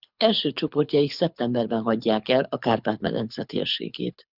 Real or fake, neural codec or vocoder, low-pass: fake; codec, 24 kHz, 6 kbps, HILCodec; 5.4 kHz